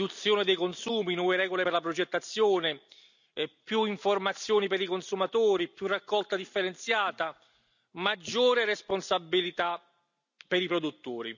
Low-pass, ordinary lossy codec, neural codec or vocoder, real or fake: 7.2 kHz; none; none; real